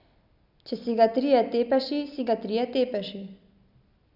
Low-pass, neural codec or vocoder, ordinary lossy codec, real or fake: 5.4 kHz; none; none; real